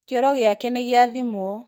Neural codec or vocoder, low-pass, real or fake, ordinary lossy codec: codec, 44.1 kHz, 2.6 kbps, SNAC; none; fake; none